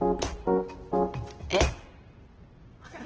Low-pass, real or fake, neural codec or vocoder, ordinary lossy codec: 7.2 kHz; real; none; Opus, 16 kbps